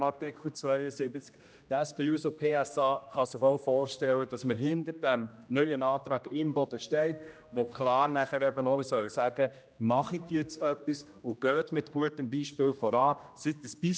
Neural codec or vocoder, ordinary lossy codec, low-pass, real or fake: codec, 16 kHz, 1 kbps, X-Codec, HuBERT features, trained on general audio; none; none; fake